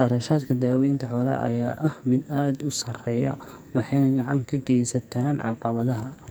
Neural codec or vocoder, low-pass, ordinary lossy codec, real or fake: codec, 44.1 kHz, 2.6 kbps, SNAC; none; none; fake